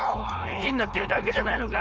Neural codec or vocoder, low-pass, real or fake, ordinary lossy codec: codec, 16 kHz, 4.8 kbps, FACodec; none; fake; none